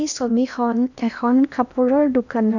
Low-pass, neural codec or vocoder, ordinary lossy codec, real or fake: 7.2 kHz; codec, 16 kHz in and 24 kHz out, 0.8 kbps, FocalCodec, streaming, 65536 codes; none; fake